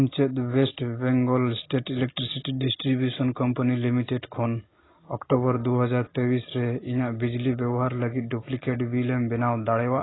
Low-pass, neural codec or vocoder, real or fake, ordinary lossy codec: 7.2 kHz; none; real; AAC, 16 kbps